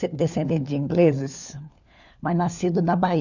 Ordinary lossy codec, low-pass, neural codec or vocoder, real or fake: none; 7.2 kHz; codec, 16 kHz, 4 kbps, FunCodec, trained on LibriTTS, 50 frames a second; fake